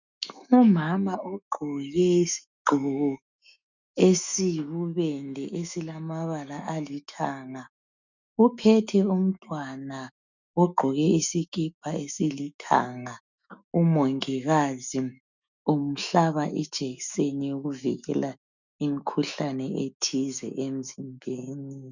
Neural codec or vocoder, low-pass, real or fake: autoencoder, 48 kHz, 128 numbers a frame, DAC-VAE, trained on Japanese speech; 7.2 kHz; fake